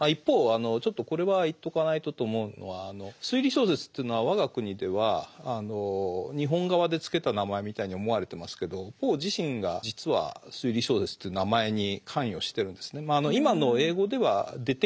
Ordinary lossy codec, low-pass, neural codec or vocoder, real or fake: none; none; none; real